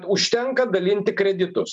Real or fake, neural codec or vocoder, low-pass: real; none; 9.9 kHz